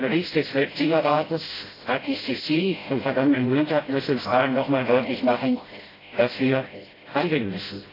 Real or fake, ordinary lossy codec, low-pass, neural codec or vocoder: fake; AAC, 24 kbps; 5.4 kHz; codec, 16 kHz, 0.5 kbps, FreqCodec, smaller model